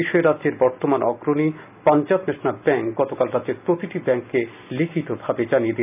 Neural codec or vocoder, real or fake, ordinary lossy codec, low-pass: none; real; none; 3.6 kHz